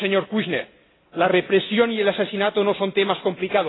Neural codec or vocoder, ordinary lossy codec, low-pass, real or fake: none; AAC, 16 kbps; 7.2 kHz; real